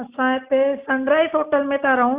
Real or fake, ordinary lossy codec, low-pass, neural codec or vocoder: real; Opus, 64 kbps; 3.6 kHz; none